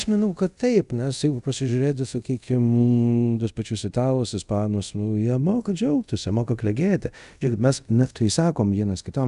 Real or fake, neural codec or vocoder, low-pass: fake; codec, 24 kHz, 0.5 kbps, DualCodec; 10.8 kHz